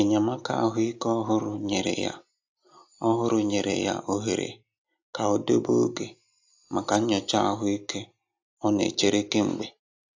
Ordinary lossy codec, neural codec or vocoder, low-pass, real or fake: AAC, 48 kbps; none; 7.2 kHz; real